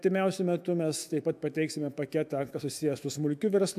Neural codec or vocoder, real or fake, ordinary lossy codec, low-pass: autoencoder, 48 kHz, 128 numbers a frame, DAC-VAE, trained on Japanese speech; fake; MP3, 96 kbps; 14.4 kHz